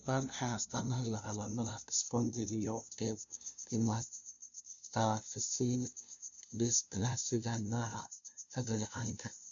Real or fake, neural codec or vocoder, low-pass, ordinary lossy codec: fake; codec, 16 kHz, 0.5 kbps, FunCodec, trained on LibriTTS, 25 frames a second; 7.2 kHz; none